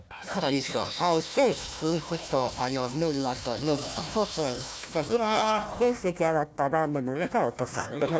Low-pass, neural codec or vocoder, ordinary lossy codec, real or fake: none; codec, 16 kHz, 1 kbps, FunCodec, trained on Chinese and English, 50 frames a second; none; fake